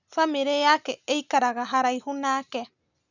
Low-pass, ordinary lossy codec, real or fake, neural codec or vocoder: 7.2 kHz; none; real; none